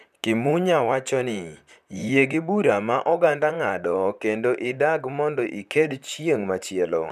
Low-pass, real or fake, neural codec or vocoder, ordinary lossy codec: 14.4 kHz; fake; vocoder, 44.1 kHz, 128 mel bands, Pupu-Vocoder; none